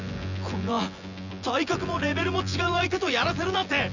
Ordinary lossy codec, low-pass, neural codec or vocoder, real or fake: none; 7.2 kHz; vocoder, 24 kHz, 100 mel bands, Vocos; fake